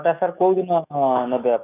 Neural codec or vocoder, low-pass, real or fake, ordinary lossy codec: autoencoder, 48 kHz, 128 numbers a frame, DAC-VAE, trained on Japanese speech; 3.6 kHz; fake; none